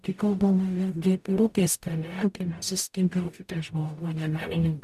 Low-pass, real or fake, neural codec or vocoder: 14.4 kHz; fake; codec, 44.1 kHz, 0.9 kbps, DAC